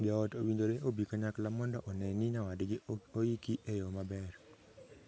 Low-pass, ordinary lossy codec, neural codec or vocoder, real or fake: none; none; none; real